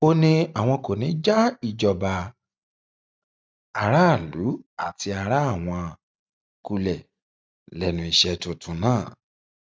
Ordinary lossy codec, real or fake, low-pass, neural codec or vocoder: none; real; none; none